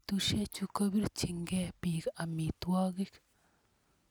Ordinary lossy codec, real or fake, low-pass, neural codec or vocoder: none; real; none; none